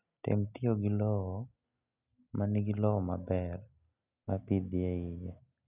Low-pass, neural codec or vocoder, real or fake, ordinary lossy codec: 3.6 kHz; none; real; none